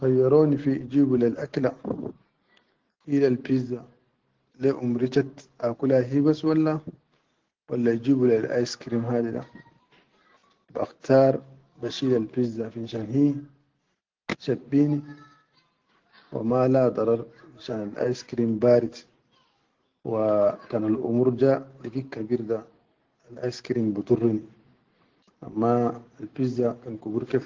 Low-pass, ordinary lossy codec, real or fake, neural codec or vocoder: 7.2 kHz; Opus, 16 kbps; real; none